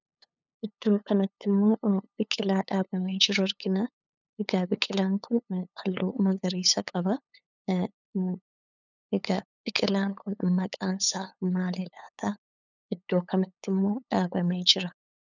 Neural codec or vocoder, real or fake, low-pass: codec, 16 kHz, 8 kbps, FunCodec, trained on LibriTTS, 25 frames a second; fake; 7.2 kHz